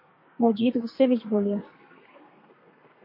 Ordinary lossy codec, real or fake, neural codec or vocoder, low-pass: MP3, 32 kbps; fake; codec, 32 kHz, 1.9 kbps, SNAC; 5.4 kHz